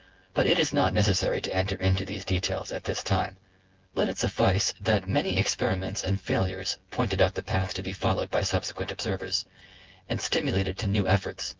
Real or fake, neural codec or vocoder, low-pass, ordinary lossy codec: fake; vocoder, 24 kHz, 100 mel bands, Vocos; 7.2 kHz; Opus, 16 kbps